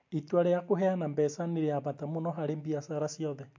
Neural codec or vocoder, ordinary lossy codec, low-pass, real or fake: none; MP3, 48 kbps; 7.2 kHz; real